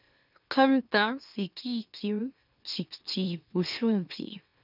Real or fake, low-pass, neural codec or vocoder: fake; 5.4 kHz; autoencoder, 44.1 kHz, a latent of 192 numbers a frame, MeloTTS